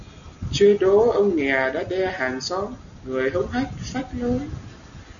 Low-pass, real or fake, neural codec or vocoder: 7.2 kHz; real; none